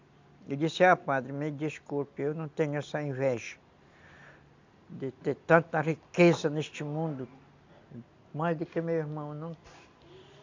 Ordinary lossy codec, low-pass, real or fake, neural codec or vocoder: MP3, 64 kbps; 7.2 kHz; real; none